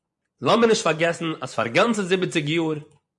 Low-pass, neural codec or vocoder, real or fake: 10.8 kHz; vocoder, 24 kHz, 100 mel bands, Vocos; fake